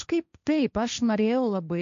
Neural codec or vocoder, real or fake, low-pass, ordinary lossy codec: codec, 16 kHz, 1.1 kbps, Voila-Tokenizer; fake; 7.2 kHz; MP3, 64 kbps